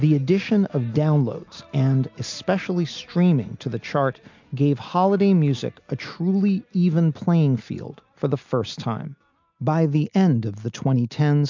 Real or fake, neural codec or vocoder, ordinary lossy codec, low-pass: real; none; MP3, 64 kbps; 7.2 kHz